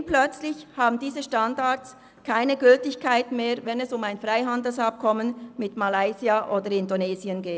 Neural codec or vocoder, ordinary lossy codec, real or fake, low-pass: none; none; real; none